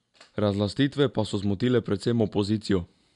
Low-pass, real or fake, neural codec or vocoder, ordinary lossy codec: 10.8 kHz; real; none; none